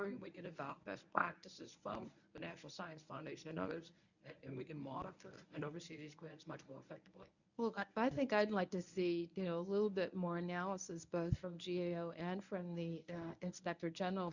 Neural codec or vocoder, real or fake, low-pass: codec, 24 kHz, 0.9 kbps, WavTokenizer, medium speech release version 1; fake; 7.2 kHz